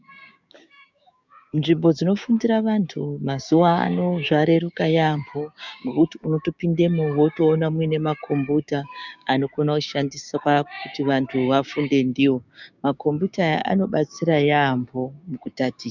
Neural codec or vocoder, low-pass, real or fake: codec, 16 kHz, 6 kbps, DAC; 7.2 kHz; fake